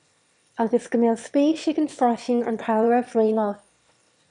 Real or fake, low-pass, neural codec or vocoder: fake; 9.9 kHz; autoencoder, 22.05 kHz, a latent of 192 numbers a frame, VITS, trained on one speaker